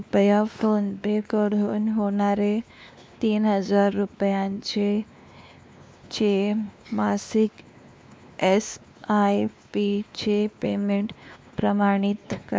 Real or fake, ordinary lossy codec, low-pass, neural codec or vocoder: fake; none; none; codec, 16 kHz, 2 kbps, X-Codec, WavLM features, trained on Multilingual LibriSpeech